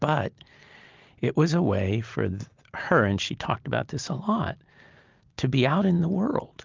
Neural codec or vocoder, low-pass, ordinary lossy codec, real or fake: none; 7.2 kHz; Opus, 32 kbps; real